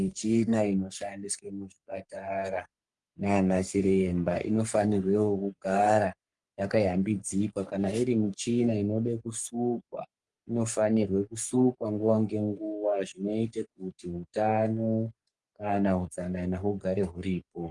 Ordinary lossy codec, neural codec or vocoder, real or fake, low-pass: Opus, 32 kbps; codec, 44.1 kHz, 3.4 kbps, Pupu-Codec; fake; 10.8 kHz